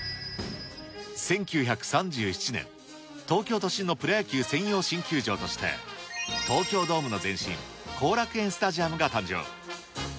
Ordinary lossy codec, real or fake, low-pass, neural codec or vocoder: none; real; none; none